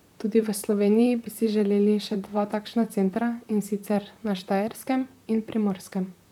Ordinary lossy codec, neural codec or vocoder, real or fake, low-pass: none; vocoder, 44.1 kHz, 128 mel bands, Pupu-Vocoder; fake; 19.8 kHz